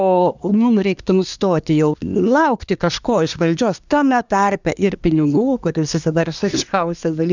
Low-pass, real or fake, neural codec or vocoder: 7.2 kHz; fake; codec, 24 kHz, 1 kbps, SNAC